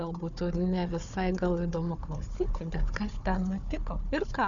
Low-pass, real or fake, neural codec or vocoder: 7.2 kHz; fake; codec, 16 kHz, 4 kbps, FunCodec, trained on Chinese and English, 50 frames a second